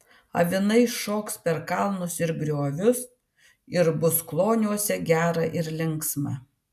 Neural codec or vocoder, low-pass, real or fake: none; 14.4 kHz; real